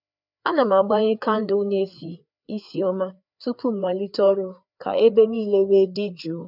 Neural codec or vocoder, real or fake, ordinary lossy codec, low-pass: codec, 16 kHz, 4 kbps, FreqCodec, larger model; fake; none; 5.4 kHz